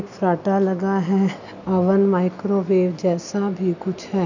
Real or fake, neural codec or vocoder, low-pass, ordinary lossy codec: real; none; 7.2 kHz; none